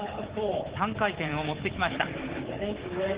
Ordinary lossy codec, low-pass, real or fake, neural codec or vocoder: Opus, 16 kbps; 3.6 kHz; fake; codec, 24 kHz, 3.1 kbps, DualCodec